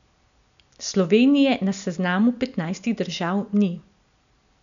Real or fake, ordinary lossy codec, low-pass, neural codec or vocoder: real; none; 7.2 kHz; none